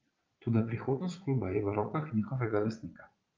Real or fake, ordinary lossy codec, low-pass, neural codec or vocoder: fake; Opus, 32 kbps; 7.2 kHz; vocoder, 22.05 kHz, 80 mel bands, Vocos